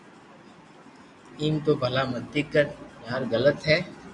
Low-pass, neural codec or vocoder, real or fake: 10.8 kHz; none; real